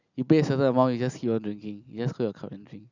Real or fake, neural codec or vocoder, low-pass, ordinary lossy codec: real; none; 7.2 kHz; none